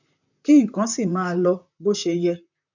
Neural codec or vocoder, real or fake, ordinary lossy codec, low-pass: vocoder, 44.1 kHz, 128 mel bands, Pupu-Vocoder; fake; none; 7.2 kHz